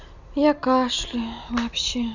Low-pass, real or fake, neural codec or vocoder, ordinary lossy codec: 7.2 kHz; real; none; none